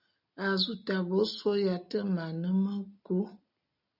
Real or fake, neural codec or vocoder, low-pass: real; none; 5.4 kHz